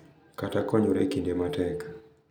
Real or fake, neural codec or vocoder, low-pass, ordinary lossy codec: real; none; none; none